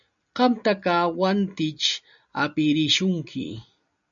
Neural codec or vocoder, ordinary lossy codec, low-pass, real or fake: none; MP3, 96 kbps; 7.2 kHz; real